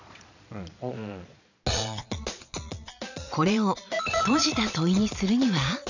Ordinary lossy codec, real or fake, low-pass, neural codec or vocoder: none; fake; 7.2 kHz; vocoder, 22.05 kHz, 80 mel bands, Vocos